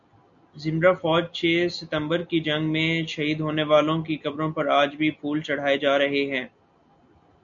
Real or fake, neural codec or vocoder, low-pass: real; none; 7.2 kHz